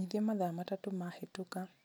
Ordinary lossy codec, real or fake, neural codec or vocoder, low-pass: none; real; none; none